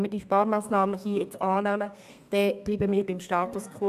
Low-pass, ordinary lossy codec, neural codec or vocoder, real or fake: 14.4 kHz; none; codec, 32 kHz, 1.9 kbps, SNAC; fake